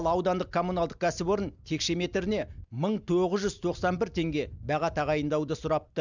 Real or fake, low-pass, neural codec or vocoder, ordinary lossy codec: real; 7.2 kHz; none; none